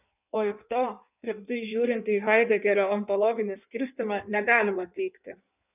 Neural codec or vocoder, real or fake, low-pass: codec, 16 kHz in and 24 kHz out, 1.1 kbps, FireRedTTS-2 codec; fake; 3.6 kHz